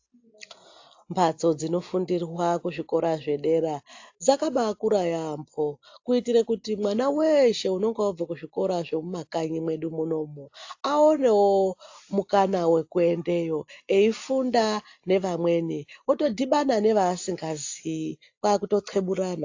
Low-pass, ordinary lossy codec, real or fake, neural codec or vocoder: 7.2 kHz; AAC, 48 kbps; real; none